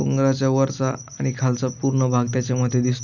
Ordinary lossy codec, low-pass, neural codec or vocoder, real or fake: none; 7.2 kHz; none; real